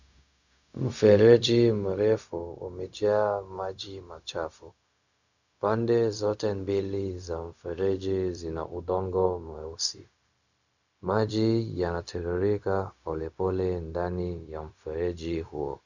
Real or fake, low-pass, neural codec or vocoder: fake; 7.2 kHz; codec, 16 kHz, 0.4 kbps, LongCat-Audio-Codec